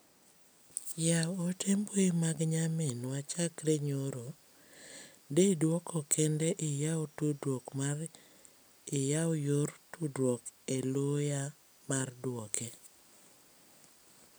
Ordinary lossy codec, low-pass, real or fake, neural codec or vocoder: none; none; real; none